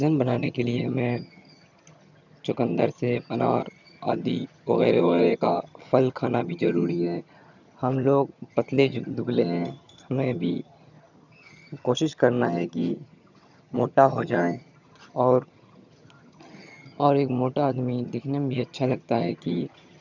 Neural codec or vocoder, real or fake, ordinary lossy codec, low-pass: vocoder, 22.05 kHz, 80 mel bands, HiFi-GAN; fake; none; 7.2 kHz